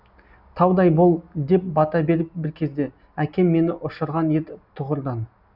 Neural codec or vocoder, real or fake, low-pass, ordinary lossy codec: none; real; 5.4 kHz; none